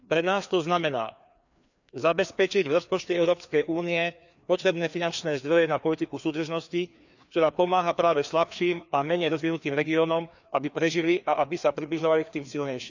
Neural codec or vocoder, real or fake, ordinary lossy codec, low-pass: codec, 16 kHz, 2 kbps, FreqCodec, larger model; fake; none; 7.2 kHz